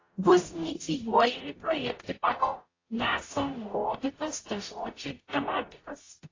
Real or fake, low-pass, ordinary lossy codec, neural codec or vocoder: fake; 7.2 kHz; AAC, 32 kbps; codec, 44.1 kHz, 0.9 kbps, DAC